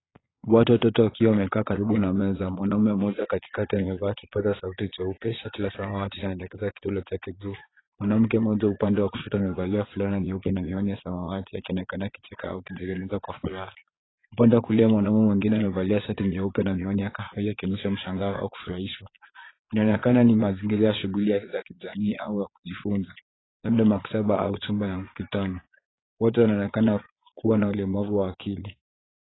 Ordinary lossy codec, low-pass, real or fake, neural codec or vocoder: AAC, 16 kbps; 7.2 kHz; fake; vocoder, 22.05 kHz, 80 mel bands, Vocos